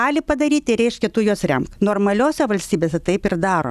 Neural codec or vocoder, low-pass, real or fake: none; 14.4 kHz; real